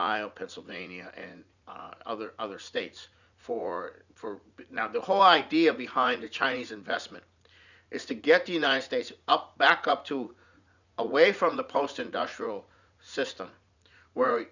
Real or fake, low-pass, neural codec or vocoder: fake; 7.2 kHz; vocoder, 44.1 kHz, 80 mel bands, Vocos